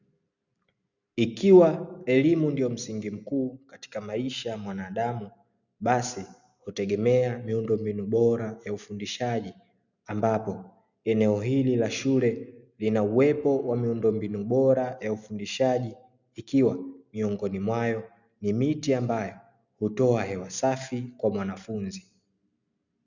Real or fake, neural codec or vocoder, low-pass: real; none; 7.2 kHz